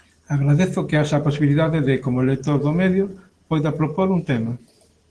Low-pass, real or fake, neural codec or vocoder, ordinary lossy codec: 10.8 kHz; real; none; Opus, 16 kbps